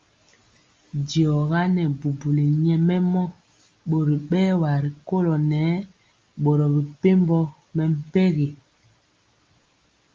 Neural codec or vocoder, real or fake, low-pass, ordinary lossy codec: none; real; 7.2 kHz; Opus, 32 kbps